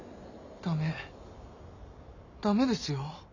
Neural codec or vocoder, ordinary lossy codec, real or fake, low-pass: none; none; real; 7.2 kHz